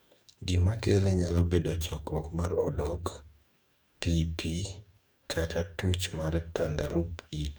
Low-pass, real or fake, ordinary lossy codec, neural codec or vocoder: none; fake; none; codec, 44.1 kHz, 2.6 kbps, DAC